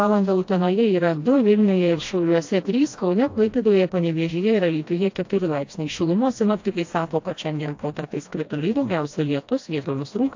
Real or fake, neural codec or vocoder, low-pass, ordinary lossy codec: fake; codec, 16 kHz, 1 kbps, FreqCodec, smaller model; 7.2 kHz; AAC, 48 kbps